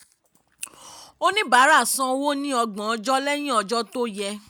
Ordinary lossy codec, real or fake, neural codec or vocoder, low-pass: none; real; none; none